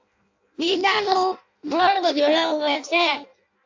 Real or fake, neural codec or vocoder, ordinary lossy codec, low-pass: fake; codec, 16 kHz in and 24 kHz out, 0.6 kbps, FireRedTTS-2 codec; AAC, 48 kbps; 7.2 kHz